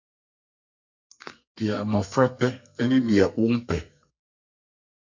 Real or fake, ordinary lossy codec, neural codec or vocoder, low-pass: fake; MP3, 48 kbps; codec, 32 kHz, 1.9 kbps, SNAC; 7.2 kHz